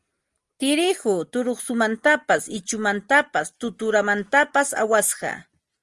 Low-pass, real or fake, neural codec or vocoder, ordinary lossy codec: 10.8 kHz; real; none; Opus, 24 kbps